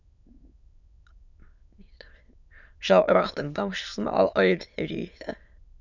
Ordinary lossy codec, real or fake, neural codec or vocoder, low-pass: none; fake; autoencoder, 22.05 kHz, a latent of 192 numbers a frame, VITS, trained on many speakers; 7.2 kHz